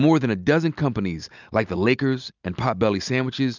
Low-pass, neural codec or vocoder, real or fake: 7.2 kHz; none; real